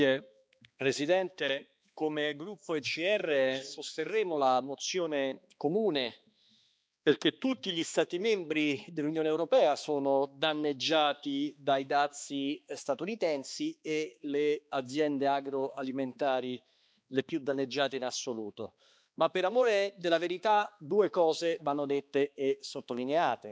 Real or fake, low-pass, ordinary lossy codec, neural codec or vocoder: fake; none; none; codec, 16 kHz, 2 kbps, X-Codec, HuBERT features, trained on balanced general audio